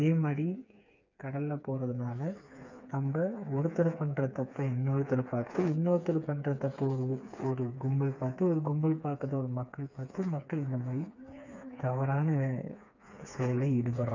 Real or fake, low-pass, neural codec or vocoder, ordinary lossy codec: fake; 7.2 kHz; codec, 16 kHz, 4 kbps, FreqCodec, smaller model; none